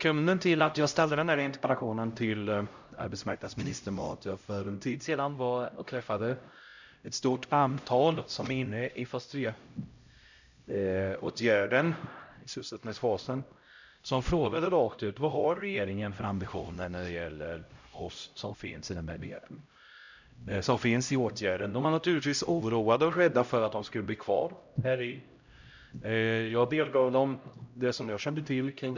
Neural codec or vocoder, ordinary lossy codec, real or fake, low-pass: codec, 16 kHz, 0.5 kbps, X-Codec, HuBERT features, trained on LibriSpeech; none; fake; 7.2 kHz